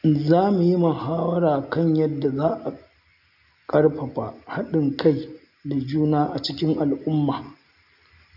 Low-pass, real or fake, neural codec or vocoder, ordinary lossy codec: 5.4 kHz; real; none; none